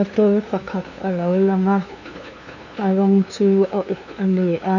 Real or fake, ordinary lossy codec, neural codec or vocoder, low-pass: fake; none; codec, 16 kHz, 2 kbps, FunCodec, trained on LibriTTS, 25 frames a second; 7.2 kHz